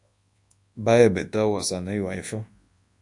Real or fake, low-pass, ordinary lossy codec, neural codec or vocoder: fake; 10.8 kHz; AAC, 64 kbps; codec, 24 kHz, 0.9 kbps, WavTokenizer, large speech release